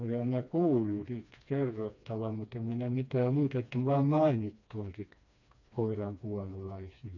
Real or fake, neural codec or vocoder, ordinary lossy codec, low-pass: fake; codec, 16 kHz, 2 kbps, FreqCodec, smaller model; none; 7.2 kHz